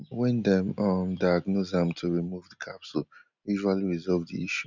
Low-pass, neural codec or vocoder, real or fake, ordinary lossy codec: 7.2 kHz; none; real; none